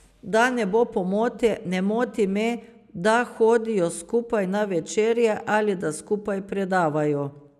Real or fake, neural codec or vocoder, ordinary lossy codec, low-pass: real; none; none; 14.4 kHz